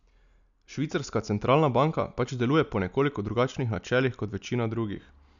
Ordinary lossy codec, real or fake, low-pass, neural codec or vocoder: none; real; 7.2 kHz; none